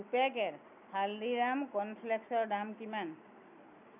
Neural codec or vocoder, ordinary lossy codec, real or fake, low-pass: none; none; real; 3.6 kHz